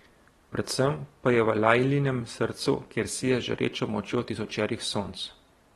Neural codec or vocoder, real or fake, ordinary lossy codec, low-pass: vocoder, 44.1 kHz, 128 mel bands every 512 samples, BigVGAN v2; fake; AAC, 32 kbps; 19.8 kHz